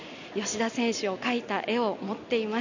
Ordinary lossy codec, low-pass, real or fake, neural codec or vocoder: none; 7.2 kHz; real; none